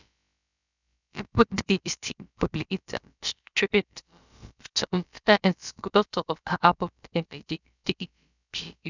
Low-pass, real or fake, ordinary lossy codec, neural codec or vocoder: 7.2 kHz; fake; none; codec, 16 kHz, about 1 kbps, DyCAST, with the encoder's durations